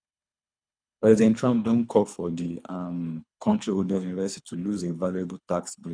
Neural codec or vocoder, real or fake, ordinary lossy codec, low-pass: codec, 24 kHz, 3 kbps, HILCodec; fake; none; 9.9 kHz